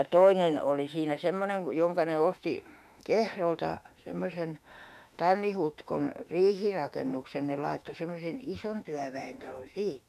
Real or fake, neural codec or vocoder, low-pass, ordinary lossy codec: fake; autoencoder, 48 kHz, 32 numbers a frame, DAC-VAE, trained on Japanese speech; 14.4 kHz; none